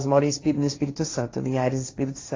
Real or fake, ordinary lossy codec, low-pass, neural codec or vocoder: fake; AAC, 32 kbps; 7.2 kHz; codec, 16 kHz, 1.1 kbps, Voila-Tokenizer